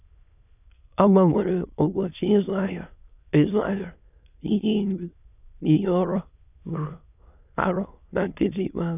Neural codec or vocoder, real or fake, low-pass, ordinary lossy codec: autoencoder, 22.05 kHz, a latent of 192 numbers a frame, VITS, trained on many speakers; fake; 3.6 kHz; none